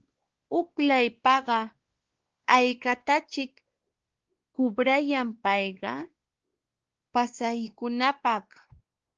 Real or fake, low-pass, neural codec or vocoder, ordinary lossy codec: fake; 7.2 kHz; codec, 16 kHz, 2 kbps, X-Codec, WavLM features, trained on Multilingual LibriSpeech; Opus, 16 kbps